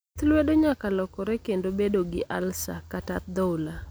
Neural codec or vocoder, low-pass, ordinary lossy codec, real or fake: none; none; none; real